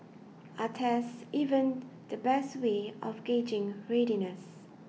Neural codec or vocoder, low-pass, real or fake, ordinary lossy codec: none; none; real; none